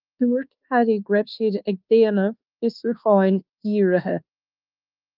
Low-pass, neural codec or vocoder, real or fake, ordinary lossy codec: 5.4 kHz; codec, 24 kHz, 1.2 kbps, DualCodec; fake; Opus, 32 kbps